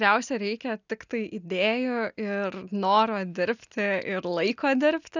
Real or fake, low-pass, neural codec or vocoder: real; 7.2 kHz; none